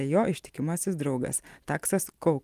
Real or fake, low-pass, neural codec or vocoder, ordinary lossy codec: real; 14.4 kHz; none; Opus, 24 kbps